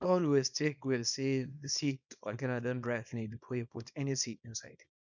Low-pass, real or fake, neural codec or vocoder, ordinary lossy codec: 7.2 kHz; fake; codec, 24 kHz, 0.9 kbps, WavTokenizer, small release; none